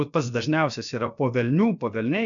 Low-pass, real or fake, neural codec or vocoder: 7.2 kHz; fake; codec, 16 kHz, about 1 kbps, DyCAST, with the encoder's durations